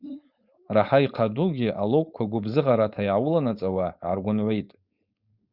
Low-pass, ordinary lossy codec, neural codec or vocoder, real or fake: 5.4 kHz; Opus, 64 kbps; codec, 16 kHz, 4.8 kbps, FACodec; fake